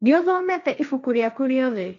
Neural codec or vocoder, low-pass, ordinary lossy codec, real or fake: codec, 16 kHz, 1.1 kbps, Voila-Tokenizer; 7.2 kHz; none; fake